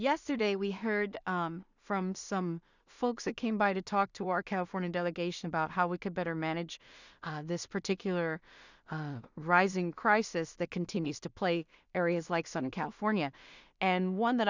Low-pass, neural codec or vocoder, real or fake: 7.2 kHz; codec, 16 kHz in and 24 kHz out, 0.4 kbps, LongCat-Audio-Codec, two codebook decoder; fake